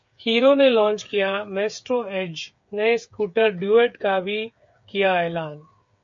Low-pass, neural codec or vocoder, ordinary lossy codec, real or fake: 7.2 kHz; codec, 16 kHz, 8 kbps, FreqCodec, smaller model; MP3, 48 kbps; fake